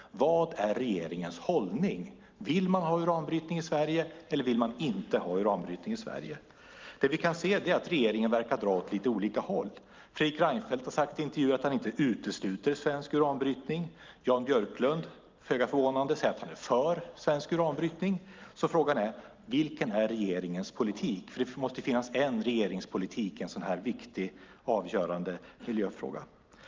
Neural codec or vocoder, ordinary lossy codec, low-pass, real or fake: none; Opus, 32 kbps; 7.2 kHz; real